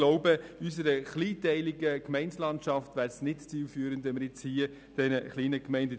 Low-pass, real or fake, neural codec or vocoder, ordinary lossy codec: none; real; none; none